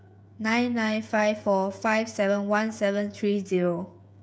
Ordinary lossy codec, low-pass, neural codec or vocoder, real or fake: none; none; codec, 16 kHz, 16 kbps, FreqCodec, smaller model; fake